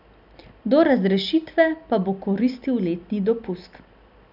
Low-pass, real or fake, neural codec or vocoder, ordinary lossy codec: 5.4 kHz; real; none; none